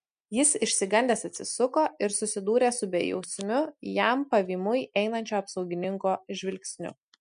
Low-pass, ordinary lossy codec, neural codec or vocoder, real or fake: 9.9 kHz; MP3, 64 kbps; none; real